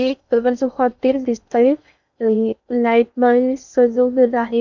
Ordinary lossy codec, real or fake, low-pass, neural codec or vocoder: none; fake; 7.2 kHz; codec, 16 kHz in and 24 kHz out, 0.6 kbps, FocalCodec, streaming, 2048 codes